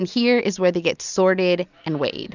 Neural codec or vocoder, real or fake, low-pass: none; real; 7.2 kHz